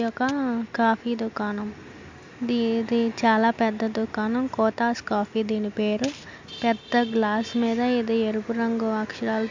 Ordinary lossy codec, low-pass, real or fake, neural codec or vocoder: MP3, 64 kbps; 7.2 kHz; real; none